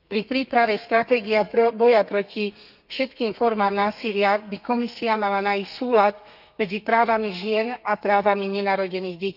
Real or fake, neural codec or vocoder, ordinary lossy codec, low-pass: fake; codec, 32 kHz, 1.9 kbps, SNAC; none; 5.4 kHz